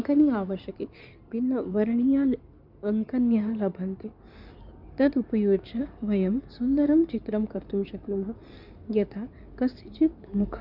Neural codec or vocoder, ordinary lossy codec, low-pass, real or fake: codec, 16 kHz in and 24 kHz out, 2.2 kbps, FireRedTTS-2 codec; none; 5.4 kHz; fake